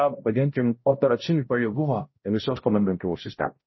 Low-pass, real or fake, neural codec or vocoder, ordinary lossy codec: 7.2 kHz; fake; codec, 16 kHz, 0.5 kbps, X-Codec, HuBERT features, trained on balanced general audio; MP3, 24 kbps